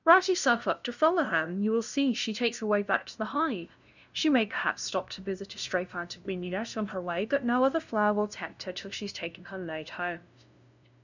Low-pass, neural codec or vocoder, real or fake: 7.2 kHz; codec, 16 kHz, 0.5 kbps, FunCodec, trained on LibriTTS, 25 frames a second; fake